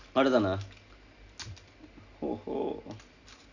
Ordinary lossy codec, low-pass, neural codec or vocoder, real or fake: none; 7.2 kHz; none; real